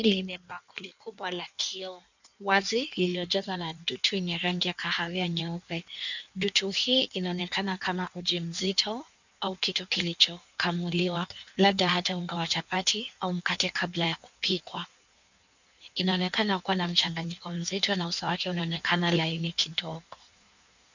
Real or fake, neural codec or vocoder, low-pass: fake; codec, 16 kHz in and 24 kHz out, 1.1 kbps, FireRedTTS-2 codec; 7.2 kHz